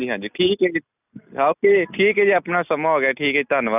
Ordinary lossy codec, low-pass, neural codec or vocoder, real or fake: none; 3.6 kHz; none; real